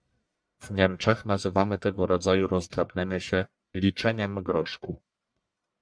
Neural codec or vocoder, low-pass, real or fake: codec, 44.1 kHz, 1.7 kbps, Pupu-Codec; 9.9 kHz; fake